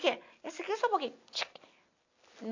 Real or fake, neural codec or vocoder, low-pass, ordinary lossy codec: real; none; 7.2 kHz; AAC, 48 kbps